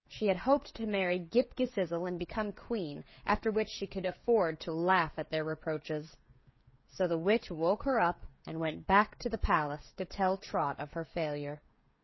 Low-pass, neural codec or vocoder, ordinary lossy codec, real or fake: 7.2 kHz; codec, 16 kHz, 16 kbps, FreqCodec, smaller model; MP3, 24 kbps; fake